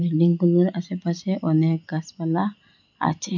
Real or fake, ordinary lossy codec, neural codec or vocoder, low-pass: real; none; none; 7.2 kHz